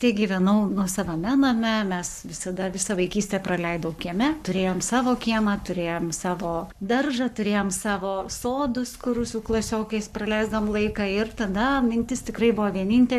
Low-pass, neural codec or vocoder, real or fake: 14.4 kHz; codec, 44.1 kHz, 7.8 kbps, Pupu-Codec; fake